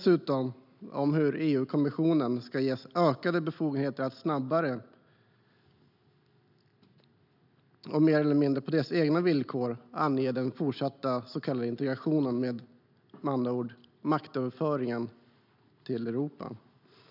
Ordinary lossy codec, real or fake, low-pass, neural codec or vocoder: none; real; 5.4 kHz; none